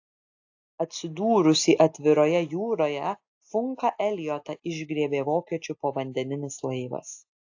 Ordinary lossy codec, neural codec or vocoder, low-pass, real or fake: AAC, 48 kbps; none; 7.2 kHz; real